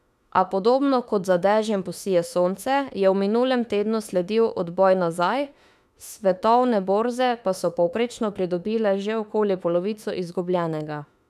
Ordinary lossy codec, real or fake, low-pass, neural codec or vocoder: none; fake; 14.4 kHz; autoencoder, 48 kHz, 32 numbers a frame, DAC-VAE, trained on Japanese speech